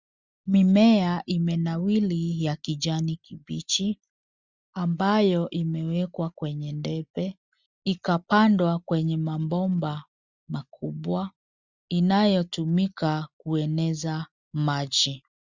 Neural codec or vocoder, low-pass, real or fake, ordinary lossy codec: none; 7.2 kHz; real; Opus, 64 kbps